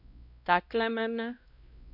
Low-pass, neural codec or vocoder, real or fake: 5.4 kHz; codec, 16 kHz, 1 kbps, X-Codec, WavLM features, trained on Multilingual LibriSpeech; fake